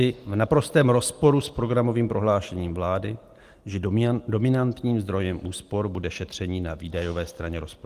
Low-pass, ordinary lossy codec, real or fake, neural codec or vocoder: 14.4 kHz; Opus, 24 kbps; real; none